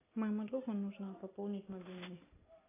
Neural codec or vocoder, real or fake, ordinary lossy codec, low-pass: none; real; AAC, 16 kbps; 3.6 kHz